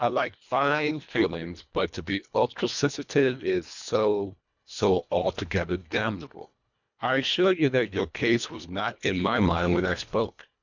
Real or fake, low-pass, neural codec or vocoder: fake; 7.2 kHz; codec, 24 kHz, 1.5 kbps, HILCodec